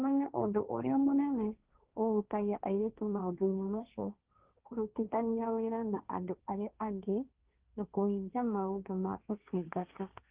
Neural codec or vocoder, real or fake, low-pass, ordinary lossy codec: codec, 16 kHz, 1.1 kbps, Voila-Tokenizer; fake; 3.6 kHz; Opus, 32 kbps